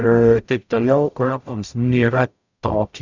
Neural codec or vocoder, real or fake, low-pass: codec, 44.1 kHz, 0.9 kbps, DAC; fake; 7.2 kHz